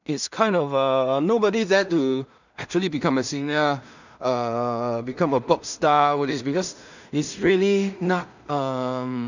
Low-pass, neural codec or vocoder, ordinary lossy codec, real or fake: 7.2 kHz; codec, 16 kHz in and 24 kHz out, 0.4 kbps, LongCat-Audio-Codec, two codebook decoder; none; fake